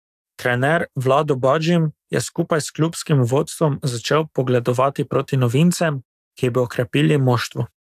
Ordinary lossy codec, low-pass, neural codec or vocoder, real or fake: AAC, 96 kbps; 14.4 kHz; codec, 44.1 kHz, 7.8 kbps, DAC; fake